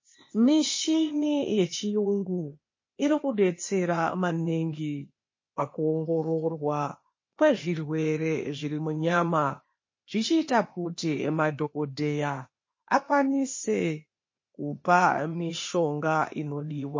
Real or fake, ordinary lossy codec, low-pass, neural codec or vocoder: fake; MP3, 32 kbps; 7.2 kHz; codec, 16 kHz, 0.8 kbps, ZipCodec